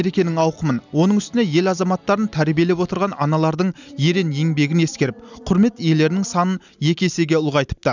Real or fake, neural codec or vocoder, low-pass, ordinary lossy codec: real; none; 7.2 kHz; none